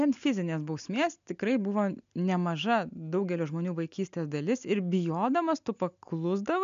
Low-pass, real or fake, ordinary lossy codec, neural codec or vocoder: 7.2 kHz; real; MP3, 64 kbps; none